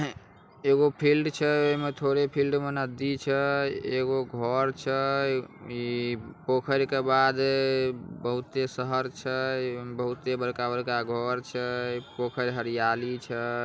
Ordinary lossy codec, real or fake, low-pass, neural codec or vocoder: none; real; none; none